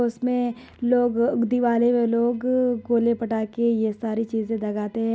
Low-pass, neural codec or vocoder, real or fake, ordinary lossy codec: none; none; real; none